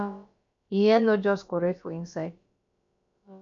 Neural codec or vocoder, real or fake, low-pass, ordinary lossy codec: codec, 16 kHz, about 1 kbps, DyCAST, with the encoder's durations; fake; 7.2 kHz; MP3, 64 kbps